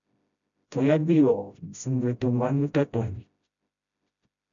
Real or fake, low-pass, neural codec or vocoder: fake; 7.2 kHz; codec, 16 kHz, 0.5 kbps, FreqCodec, smaller model